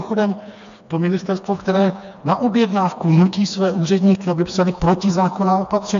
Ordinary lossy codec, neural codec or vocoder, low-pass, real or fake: MP3, 64 kbps; codec, 16 kHz, 2 kbps, FreqCodec, smaller model; 7.2 kHz; fake